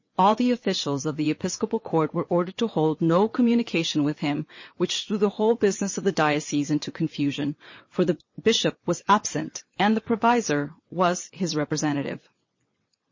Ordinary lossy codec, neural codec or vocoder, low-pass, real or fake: MP3, 32 kbps; vocoder, 22.05 kHz, 80 mel bands, WaveNeXt; 7.2 kHz; fake